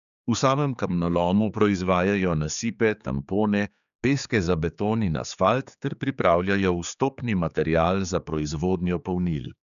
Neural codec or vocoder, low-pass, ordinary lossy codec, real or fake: codec, 16 kHz, 4 kbps, X-Codec, HuBERT features, trained on general audio; 7.2 kHz; none; fake